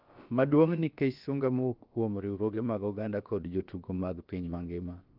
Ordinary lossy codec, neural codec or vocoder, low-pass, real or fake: Opus, 32 kbps; codec, 16 kHz, about 1 kbps, DyCAST, with the encoder's durations; 5.4 kHz; fake